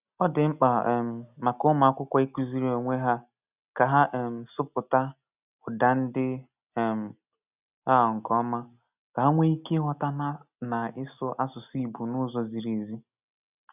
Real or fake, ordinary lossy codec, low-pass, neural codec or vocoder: real; none; 3.6 kHz; none